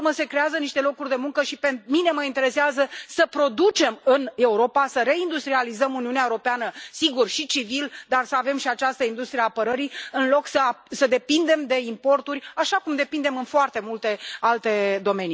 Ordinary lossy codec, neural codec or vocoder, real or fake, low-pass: none; none; real; none